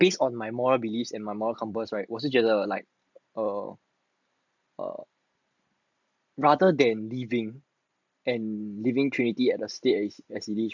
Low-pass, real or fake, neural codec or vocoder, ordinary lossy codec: 7.2 kHz; real; none; none